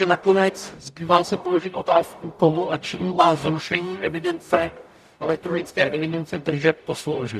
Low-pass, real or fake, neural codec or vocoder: 14.4 kHz; fake; codec, 44.1 kHz, 0.9 kbps, DAC